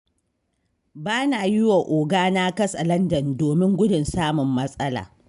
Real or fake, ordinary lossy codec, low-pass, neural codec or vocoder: real; none; 10.8 kHz; none